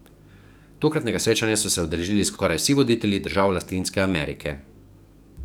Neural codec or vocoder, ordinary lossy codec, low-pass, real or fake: codec, 44.1 kHz, 7.8 kbps, DAC; none; none; fake